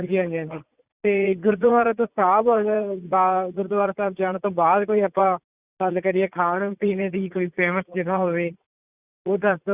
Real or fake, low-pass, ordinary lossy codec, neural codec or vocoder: fake; 3.6 kHz; Opus, 64 kbps; vocoder, 44.1 kHz, 80 mel bands, Vocos